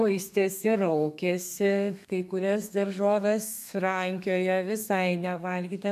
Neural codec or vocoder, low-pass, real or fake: codec, 32 kHz, 1.9 kbps, SNAC; 14.4 kHz; fake